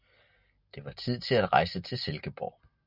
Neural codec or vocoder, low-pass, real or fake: none; 5.4 kHz; real